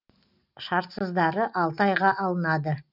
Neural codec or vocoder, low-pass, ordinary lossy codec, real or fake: none; 5.4 kHz; none; real